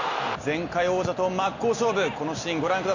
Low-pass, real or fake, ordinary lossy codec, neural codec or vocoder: 7.2 kHz; real; none; none